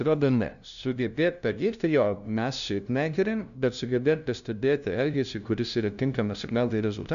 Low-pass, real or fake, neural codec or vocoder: 7.2 kHz; fake; codec, 16 kHz, 0.5 kbps, FunCodec, trained on LibriTTS, 25 frames a second